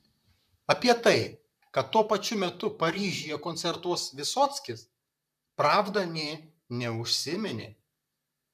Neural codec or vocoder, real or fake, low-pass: vocoder, 44.1 kHz, 128 mel bands, Pupu-Vocoder; fake; 14.4 kHz